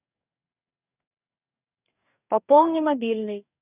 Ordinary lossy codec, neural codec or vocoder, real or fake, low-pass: none; codec, 44.1 kHz, 2.6 kbps, DAC; fake; 3.6 kHz